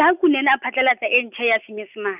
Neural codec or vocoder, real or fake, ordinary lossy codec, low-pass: none; real; none; 3.6 kHz